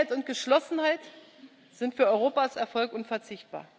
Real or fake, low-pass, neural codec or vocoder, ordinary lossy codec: real; none; none; none